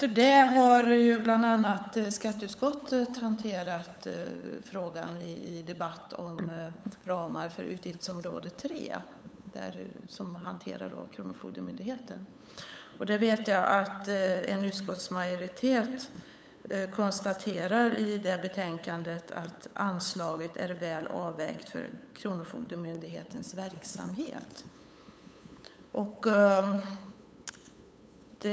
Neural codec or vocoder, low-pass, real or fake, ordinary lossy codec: codec, 16 kHz, 8 kbps, FunCodec, trained on LibriTTS, 25 frames a second; none; fake; none